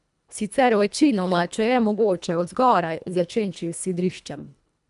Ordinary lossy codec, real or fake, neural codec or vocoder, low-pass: none; fake; codec, 24 kHz, 1.5 kbps, HILCodec; 10.8 kHz